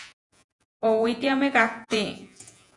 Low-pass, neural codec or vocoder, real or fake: 10.8 kHz; vocoder, 48 kHz, 128 mel bands, Vocos; fake